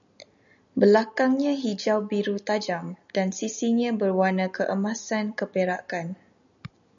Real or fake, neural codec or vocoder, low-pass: real; none; 7.2 kHz